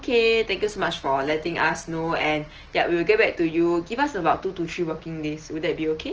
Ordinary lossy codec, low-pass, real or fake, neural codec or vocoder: Opus, 16 kbps; 7.2 kHz; real; none